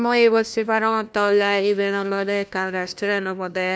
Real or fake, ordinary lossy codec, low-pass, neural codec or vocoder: fake; none; none; codec, 16 kHz, 1 kbps, FunCodec, trained on LibriTTS, 50 frames a second